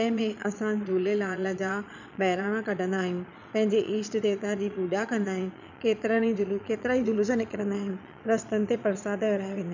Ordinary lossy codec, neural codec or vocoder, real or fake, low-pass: none; vocoder, 22.05 kHz, 80 mel bands, Vocos; fake; 7.2 kHz